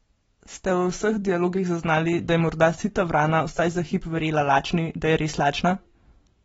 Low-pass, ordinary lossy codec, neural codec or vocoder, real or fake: 19.8 kHz; AAC, 24 kbps; none; real